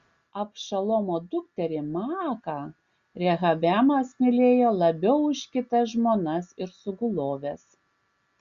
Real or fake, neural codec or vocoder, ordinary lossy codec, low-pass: real; none; Opus, 64 kbps; 7.2 kHz